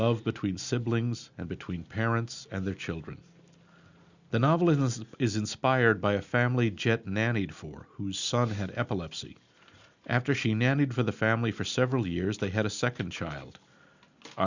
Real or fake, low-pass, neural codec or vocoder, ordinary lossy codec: real; 7.2 kHz; none; Opus, 64 kbps